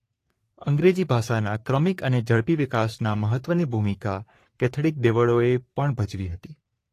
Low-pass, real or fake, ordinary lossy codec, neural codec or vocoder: 14.4 kHz; fake; AAC, 48 kbps; codec, 44.1 kHz, 3.4 kbps, Pupu-Codec